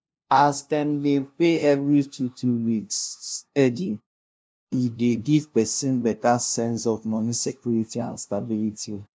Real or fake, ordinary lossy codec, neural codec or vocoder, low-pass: fake; none; codec, 16 kHz, 0.5 kbps, FunCodec, trained on LibriTTS, 25 frames a second; none